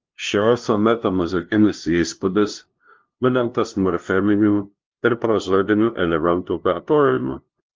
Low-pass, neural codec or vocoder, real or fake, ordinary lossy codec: 7.2 kHz; codec, 16 kHz, 0.5 kbps, FunCodec, trained on LibriTTS, 25 frames a second; fake; Opus, 32 kbps